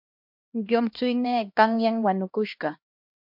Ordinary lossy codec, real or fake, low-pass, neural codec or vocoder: MP3, 48 kbps; fake; 5.4 kHz; codec, 16 kHz, 1 kbps, X-Codec, HuBERT features, trained on LibriSpeech